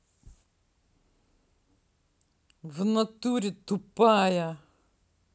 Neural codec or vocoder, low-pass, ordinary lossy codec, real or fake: none; none; none; real